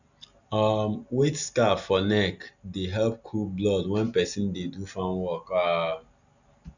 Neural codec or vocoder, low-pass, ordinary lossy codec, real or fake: none; 7.2 kHz; none; real